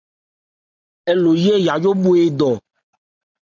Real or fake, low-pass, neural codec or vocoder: real; 7.2 kHz; none